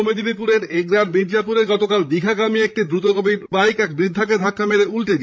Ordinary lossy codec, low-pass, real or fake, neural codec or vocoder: none; none; fake; codec, 16 kHz, 16 kbps, FreqCodec, larger model